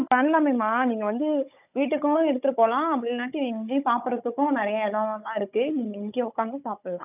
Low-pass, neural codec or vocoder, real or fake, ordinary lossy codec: 3.6 kHz; codec, 16 kHz, 16 kbps, FunCodec, trained on Chinese and English, 50 frames a second; fake; none